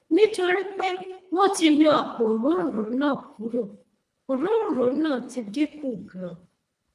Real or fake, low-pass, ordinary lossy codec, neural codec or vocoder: fake; none; none; codec, 24 kHz, 1.5 kbps, HILCodec